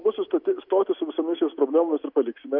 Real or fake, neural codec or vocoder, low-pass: real; none; 5.4 kHz